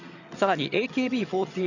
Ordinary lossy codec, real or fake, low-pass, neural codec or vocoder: none; fake; 7.2 kHz; vocoder, 22.05 kHz, 80 mel bands, HiFi-GAN